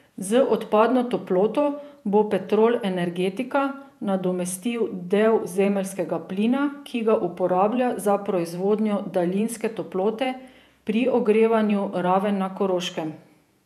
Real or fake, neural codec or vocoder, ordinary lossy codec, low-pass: fake; vocoder, 48 kHz, 128 mel bands, Vocos; none; 14.4 kHz